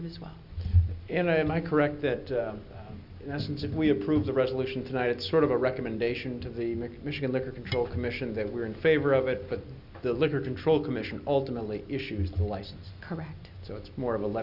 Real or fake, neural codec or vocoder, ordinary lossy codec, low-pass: real; none; Opus, 64 kbps; 5.4 kHz